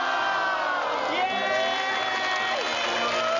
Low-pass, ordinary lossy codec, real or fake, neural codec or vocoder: 7.2 kHz; none; real; none